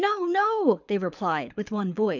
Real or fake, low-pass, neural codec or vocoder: fake; 7.2 kHz; codec, 24 kHz, 6 kbps, HILCodec